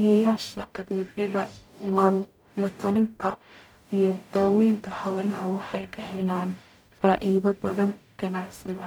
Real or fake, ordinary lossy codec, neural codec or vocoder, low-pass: fake; none; codec, 44.1 kHz, 0.9 kbps, DAC; none